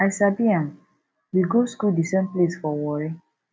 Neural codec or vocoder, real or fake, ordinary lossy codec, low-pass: none; real; none; none